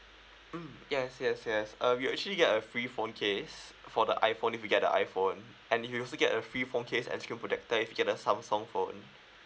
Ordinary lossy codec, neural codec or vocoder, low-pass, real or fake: none; none; none; real